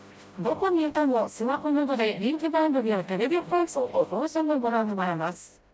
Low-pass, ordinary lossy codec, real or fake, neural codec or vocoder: none; none; fake; codec, 16 kHz, 0.5 kbps, FreqCodec, smaller model